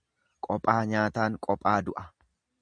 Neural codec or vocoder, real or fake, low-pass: none; real; 9.9 kHz